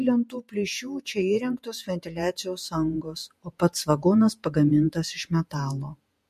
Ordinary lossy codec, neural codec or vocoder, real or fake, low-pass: MP3, 64 kbps; vocoder, 48 kHz, 128 mel bands, Vocos; fake; 14.4 kHz